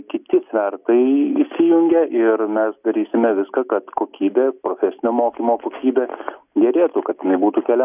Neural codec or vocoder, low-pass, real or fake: none; 3.6 kHz; real